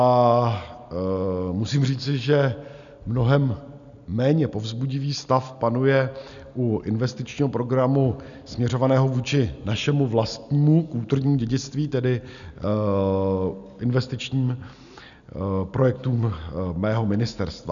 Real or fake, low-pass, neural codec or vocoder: real; 7.2 kHz; none